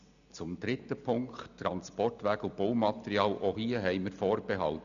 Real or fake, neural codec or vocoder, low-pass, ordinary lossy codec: real; none; 7.2 kHz; none